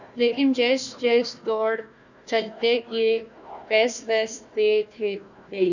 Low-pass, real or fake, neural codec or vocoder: 7.2 kHz; fake; codec, 16 kHz, 1 kbps, FunCodec, trained on Chinese and English, 50 frames a second